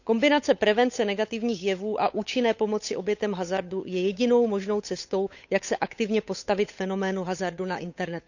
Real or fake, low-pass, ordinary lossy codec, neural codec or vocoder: fake; 7.2 kHz; none; codec, 16 kHz, 8 kbps, FunCodec, trained on Chinese and English, 25 frames a second